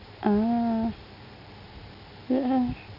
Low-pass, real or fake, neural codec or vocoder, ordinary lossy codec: 5.4 kHz; real; none; none